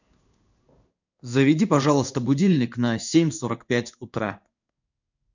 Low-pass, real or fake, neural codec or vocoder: 7.2 kHz; fake; codec, 16 kHz in and 24 kHz out, 1 kbps, XY-Tokenizer